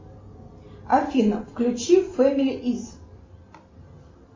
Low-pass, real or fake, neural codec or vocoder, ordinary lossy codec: 7.2 kHz; real; none; MP3, 32 kbps